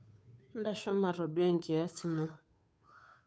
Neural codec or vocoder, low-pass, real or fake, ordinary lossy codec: codec, 16 kHz, 2 kbps, FunCodec, trained on Chinese and English, 25 frames a second; none; fake; none